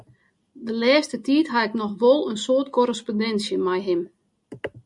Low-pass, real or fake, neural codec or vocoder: 10.8 kHz; real; none